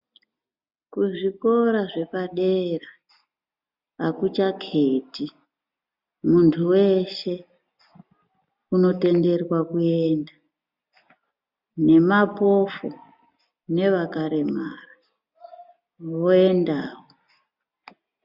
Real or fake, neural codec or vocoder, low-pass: real; none; 5.4 kHz